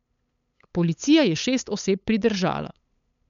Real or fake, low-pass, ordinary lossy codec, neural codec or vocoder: fake; 7.2 kHz; none; codec, 16 kHz, 8 kbps, FunCodec, trained on LibriTTS, 25 frames a second